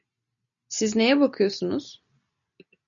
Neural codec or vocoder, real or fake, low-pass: none; real; 7.2 kHz